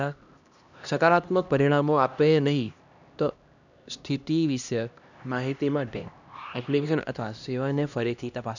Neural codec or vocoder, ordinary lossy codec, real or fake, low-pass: codec, 16 kHz, 1 kbps, X-Codec, HuBERT features, trained on LibriSpeech; none; fake; 7.2 kHz